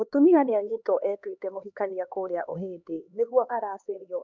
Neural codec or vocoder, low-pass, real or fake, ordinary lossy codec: codec, 16 kHz, 2 kbps, X-Codec, HuBERT features, trained on LibriSpeech; 7.2 kHz; fake; none